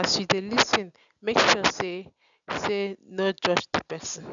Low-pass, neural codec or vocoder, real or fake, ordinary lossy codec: 7.2 kHz; none; real; none